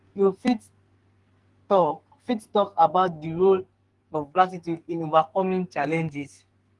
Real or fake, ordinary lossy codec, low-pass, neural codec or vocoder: fake; Opus, 24 kbps; 10.8 kHz; codec, 44.1 kHz, 2.6 kbps, SNAC